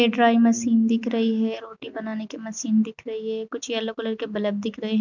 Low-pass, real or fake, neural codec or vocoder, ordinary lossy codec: 7.2 kHz; real; none; none